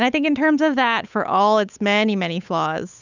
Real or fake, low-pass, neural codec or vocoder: fake; 7.2 kHz; codec, 16 kHz, 8 kbps, FunCodec, trained on Chinese and English, 25 frames a second